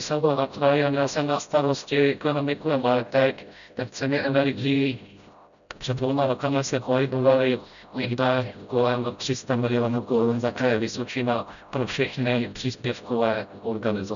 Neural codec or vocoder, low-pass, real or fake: codec, 16 kHz, 0.5 kbps, FreqCodec, smaller model; 7.2 kHz; fake